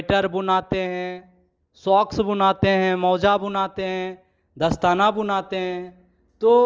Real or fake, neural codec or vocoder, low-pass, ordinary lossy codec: real; none; 7.2 kHz; Opus, 24 kbps